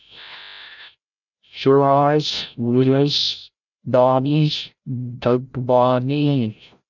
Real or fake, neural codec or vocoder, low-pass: fake; codec, 16 kHz, 0.5 kbps, FreqCodec, larger model; 7.2 kHz